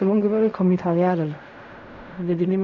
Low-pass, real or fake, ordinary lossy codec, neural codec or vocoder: 7.2 kHz; fake; none; codec, 16 kHz in and 24 kHz out, 0.4 kbps, LongCat-Audio-Codec, fine tuned four codebook decoder